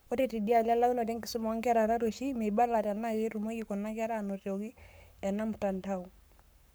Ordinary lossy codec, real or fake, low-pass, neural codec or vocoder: none; fake; none; codec, 44.1 kHz, 7.8 kbps, Pupu-Codec